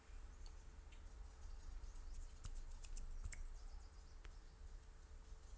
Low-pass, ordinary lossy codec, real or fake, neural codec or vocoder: none; none; real; none